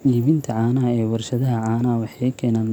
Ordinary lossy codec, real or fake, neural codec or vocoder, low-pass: none; real; none; 19.8 kHz